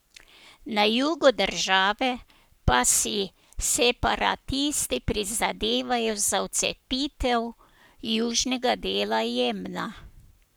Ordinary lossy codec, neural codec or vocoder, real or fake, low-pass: none; codec, 44.1 kHz, 7.8 kbps, Pupu-Codec; fake; none